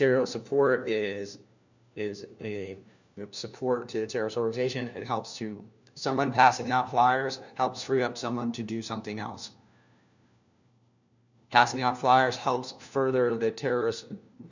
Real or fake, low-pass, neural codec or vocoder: fake; 7.2 kHz; codec, 16 kHz, 1 kbps, FunCodec, trained on LibriTTS, 50 frames a second